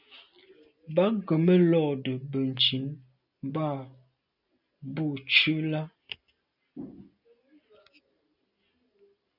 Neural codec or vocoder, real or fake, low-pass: none; real; 5.4 kHz